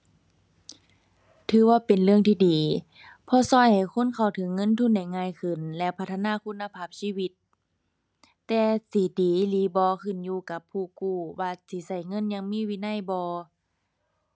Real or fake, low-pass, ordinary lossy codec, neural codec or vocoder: real; none; none; none